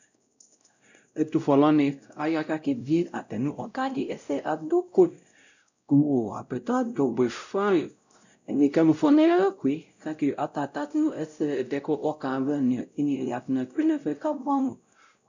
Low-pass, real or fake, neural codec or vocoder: 7.2 kHz; fake; codec, 16 kHz, 0.5 kbps, X-Codec, WavLM features, trained on Multilingual LibriSpeech